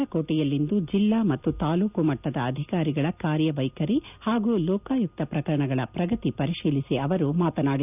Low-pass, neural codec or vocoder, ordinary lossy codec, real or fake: 3.6 kHz; none; none; real